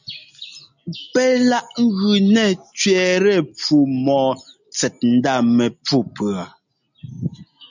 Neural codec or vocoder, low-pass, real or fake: none; 7.2 kHz; real